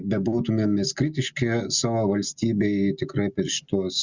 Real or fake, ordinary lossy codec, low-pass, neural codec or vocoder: real; Opus, 64 kbps; 7.2 kHz; none